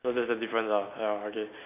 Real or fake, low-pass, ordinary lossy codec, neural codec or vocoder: real; 3.6 kHz; none; none